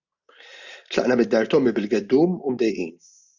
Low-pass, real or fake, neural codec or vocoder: 7.2 kHz; real; none